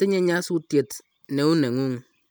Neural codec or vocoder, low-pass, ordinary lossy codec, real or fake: none; none; none; real